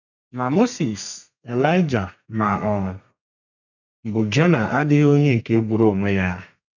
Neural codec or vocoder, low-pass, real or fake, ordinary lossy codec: codec, 32 kHz, 1.9 kbps, SNAC; 7.2 kHz; fake; none